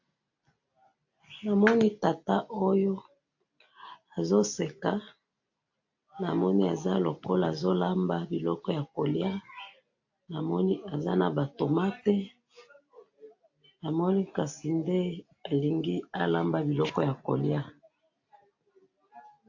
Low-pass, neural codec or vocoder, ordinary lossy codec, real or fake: 7.2 kHz; none; AAC, 48 kbps; real